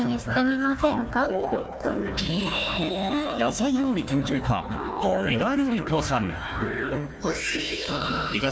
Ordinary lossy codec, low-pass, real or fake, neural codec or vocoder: none; none; fake; codec, 16 kHz, 1 kbps, FunCodec, trained on Chinese and English, 50 frames a second